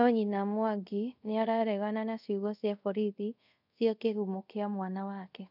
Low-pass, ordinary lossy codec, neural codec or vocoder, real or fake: 5.4 kHz; none; codec, 24 kHz, 0.5 kbps, DualCodec; fake